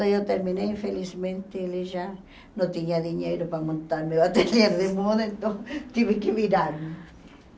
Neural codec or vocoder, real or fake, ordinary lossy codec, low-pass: none; real; none; none